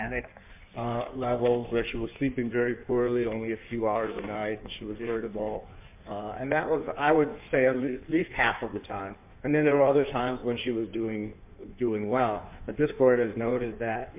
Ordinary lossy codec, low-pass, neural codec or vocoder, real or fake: MP3, 32 kbps; 3.6 kHz; codec, 16 kHz in and 24 kHz out, 1.1 kbps, FireRedTTS-2 codec; fake